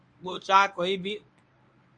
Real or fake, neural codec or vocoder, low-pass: fake; codec, 24 kHz, 0.9 kbps, WavTokenizer, medium speech release version 1; 9.9 kHz